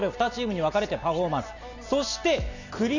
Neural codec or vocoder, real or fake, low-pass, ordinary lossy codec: none; real; 7.2 kHz; none